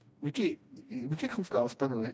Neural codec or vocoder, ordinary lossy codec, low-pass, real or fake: codec, 16 kHz, 1 kbps, FreqCodec, smaller model; none; none; fake